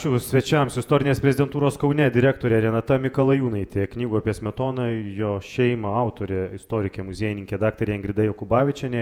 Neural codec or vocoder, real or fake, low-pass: vocoder, 48 kHz, 128 mel bands, Vocos; fake; 19.8 kHz